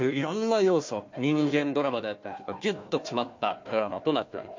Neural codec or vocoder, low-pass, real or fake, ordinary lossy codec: codec, 16 kHz, 1 kbps, FunCodec, trained on Chinese and English, 50 frames a second; 7.2 kHz; fake; MP3, 48 kbps